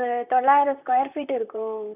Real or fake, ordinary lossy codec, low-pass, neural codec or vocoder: fake; none; 3.6 kHz; vocoder, 44.1 kHz, 128 mel bands, Pupu-Vocoder